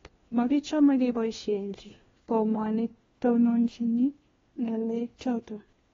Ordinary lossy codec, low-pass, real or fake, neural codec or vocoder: AAC, 24 kbps; 7.2 kHz; fake; codec, 16 kHz, 1 kbps, FunCodec, trained on LibriTTS, 50 frames a second